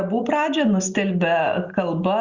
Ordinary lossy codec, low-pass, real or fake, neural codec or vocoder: Opus, 64 kbps; 7.2 kHz; real; none